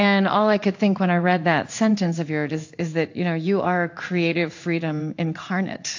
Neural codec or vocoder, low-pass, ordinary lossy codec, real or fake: codec, 16 kHz in and 24 kHz out, 1 kbps, XY-Tokenizer; 7.2 kHz; AAC, 48 kbps; fake